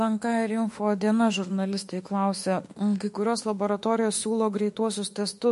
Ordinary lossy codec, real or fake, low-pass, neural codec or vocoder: MP3, 48 kbps; fake; 14.4 kHz; autoencoder, 48 kHz, 32 numbers a frame, DAC-VAE, trained on Japanese speech